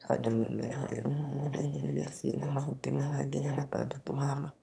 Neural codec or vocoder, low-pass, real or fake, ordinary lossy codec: autoencoder, 22.05 kHz, a latent of 192 numbers a frame, VITS, trained on one speaker; none; fake; none